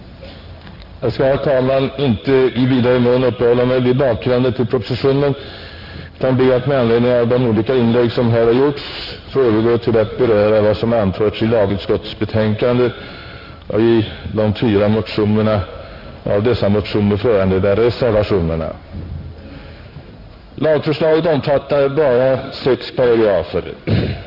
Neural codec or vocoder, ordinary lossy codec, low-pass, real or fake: codec, 16 kHz in and 24 kHz out, 1 kbps, XY-Tokenizer; none; 5.4 kHz; fake